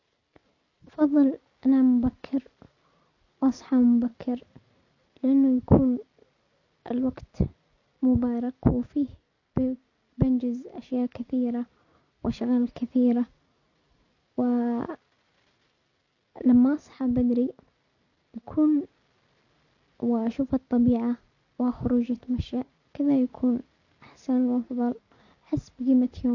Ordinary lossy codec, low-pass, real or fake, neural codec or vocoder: none; 7.2 kHz; real; none